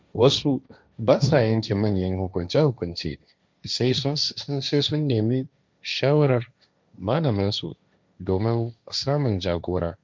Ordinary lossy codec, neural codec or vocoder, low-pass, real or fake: none; codec, 16 kHz, 1.1 kbps, Voila-Tokenizer; none; fake